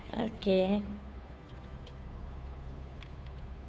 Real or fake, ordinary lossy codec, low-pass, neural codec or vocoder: fake; none; none; codec, 16 kHz, 2 kbps, FunCodec, trained on Chinese and English, 25 frames a second